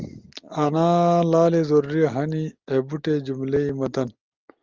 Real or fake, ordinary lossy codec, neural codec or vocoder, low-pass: real; Opus, 24 kbps; none; 7.2 kHz